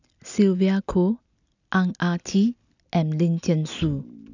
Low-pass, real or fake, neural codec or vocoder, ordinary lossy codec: 7.2 kHz; real; none; none